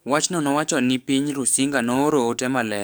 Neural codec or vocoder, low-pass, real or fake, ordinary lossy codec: codec, 44.1 kHz, 7.8 kbps, DAC; none; fake; none